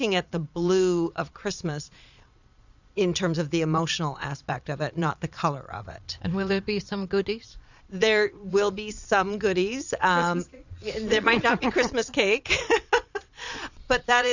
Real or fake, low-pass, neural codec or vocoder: fake; 7.2 kHz; vocoder, 22.05 kHz, 80 mel bands, Vocos